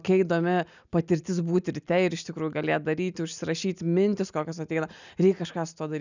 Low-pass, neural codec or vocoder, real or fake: 7.2 kHz; none; real